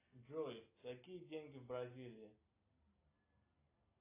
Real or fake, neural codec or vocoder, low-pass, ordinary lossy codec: real; none; 3.6 kHz; AAC, 16 kbps